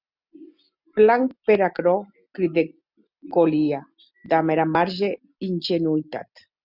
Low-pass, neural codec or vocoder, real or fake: 5.4 kHz; none; real